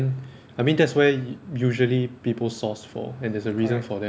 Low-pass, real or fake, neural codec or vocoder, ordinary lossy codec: none; real; none; none